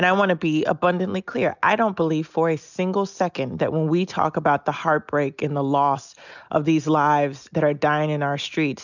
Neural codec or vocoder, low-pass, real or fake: none; 7.2 kHz; real